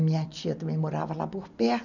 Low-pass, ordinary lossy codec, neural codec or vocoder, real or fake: 7.2 kHz; none; none; real